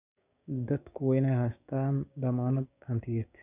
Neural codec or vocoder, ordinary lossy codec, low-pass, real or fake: codec, 16 kHz, 6 kbps, DAC; none; 3.6 kHz; fake